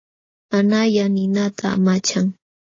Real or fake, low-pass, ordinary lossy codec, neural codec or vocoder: real; 7.2 kHz; AAC, 32 kbps; none